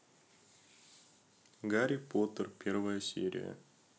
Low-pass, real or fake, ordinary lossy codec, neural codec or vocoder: none; real; none; none